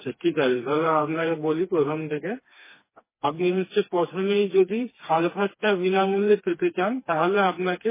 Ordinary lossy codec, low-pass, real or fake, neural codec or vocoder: MP3, 16 kbps; 3.6 kHz; fake; codec, 16 kHz, 2 kbps, FreqCodec, smaller model